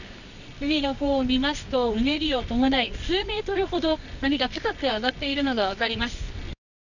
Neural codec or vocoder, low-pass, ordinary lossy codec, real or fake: codec, 24 kHz, 0.9 kbps, WavTokenizer, medium music audio release; 7.2 kHz; none; fake